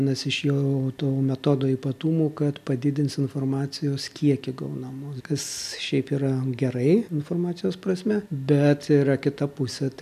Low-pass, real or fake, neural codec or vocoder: 14.4 kHz; real; none